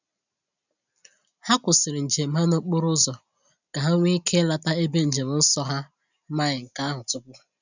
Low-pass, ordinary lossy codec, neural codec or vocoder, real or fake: 7.2 kHz; none; none; real